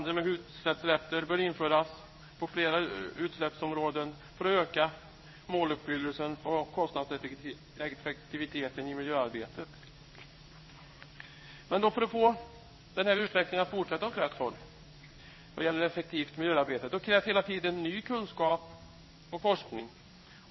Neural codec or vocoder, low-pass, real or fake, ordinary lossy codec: codec, 16 kHz in and 24 kHz out, 1 kbps, XY-Tokenizer; 7.2 kHz; fake; MP3, 24 kbps